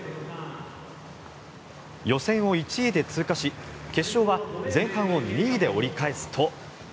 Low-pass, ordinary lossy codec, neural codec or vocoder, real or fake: none; none; none; real